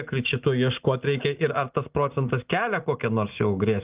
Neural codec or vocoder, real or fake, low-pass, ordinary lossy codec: none; real; 3.6 kHz; Opus, 32 kbps